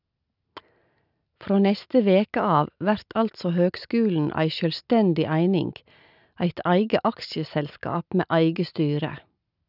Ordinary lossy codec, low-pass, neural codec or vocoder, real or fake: none; 5.4 kHz; none; real